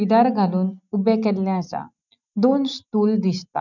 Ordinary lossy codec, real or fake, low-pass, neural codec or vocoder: none; real; 7.2 kHz; none